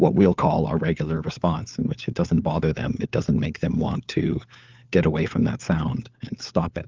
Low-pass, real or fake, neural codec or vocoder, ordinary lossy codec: 7.2 kHz; fake; codec, 16 kHz, 4 kbps, FunCodec, trained on LibriTTS, 50 frames a second; Opus, 24 kbps